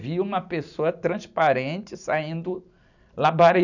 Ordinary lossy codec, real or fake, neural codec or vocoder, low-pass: none; real; none; 7.2 kHz